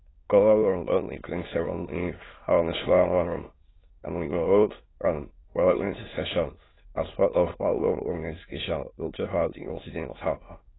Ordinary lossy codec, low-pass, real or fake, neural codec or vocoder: AAC, 16 kbps; 7.2 kHz; fake; autoencoder, 22.05 kHz, a latent of 192 numbers a frame, VITS, trained on many speakers